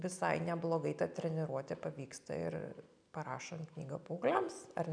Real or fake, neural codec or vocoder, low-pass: real; none; 9.9 kHz